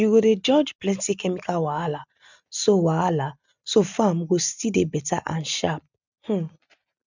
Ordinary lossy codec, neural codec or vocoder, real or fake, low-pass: none; none; real; 7.2 kHz